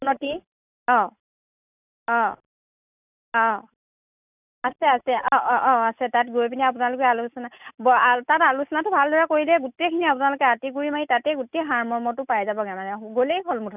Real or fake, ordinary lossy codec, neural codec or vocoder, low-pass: real; none; none; 3.6 kHz